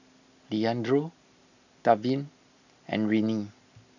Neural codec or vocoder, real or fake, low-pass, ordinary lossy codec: none; real; 7.2 kHz; none